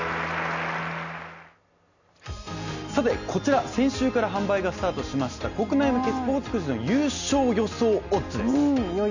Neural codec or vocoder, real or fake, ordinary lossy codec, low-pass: none; real; none; 7.2 kHz